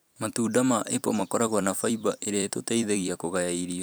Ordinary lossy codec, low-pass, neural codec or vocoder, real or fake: none; none; vocoder, 44.1 kHz, 128 mel bands every 256 samples, BigVGAN v2; fake